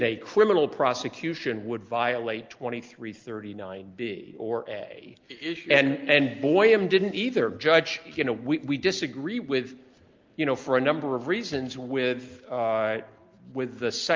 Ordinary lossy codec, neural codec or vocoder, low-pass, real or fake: Opus, 32 kbps; none; 7.2 kHz; real